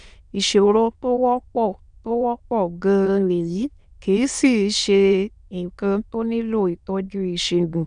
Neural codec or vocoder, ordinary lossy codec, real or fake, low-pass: autoencoder, 22.05 kHz, a latent of 192 numbers a frame, VITS, trained on many speakers; none; fake; 9.9 kHz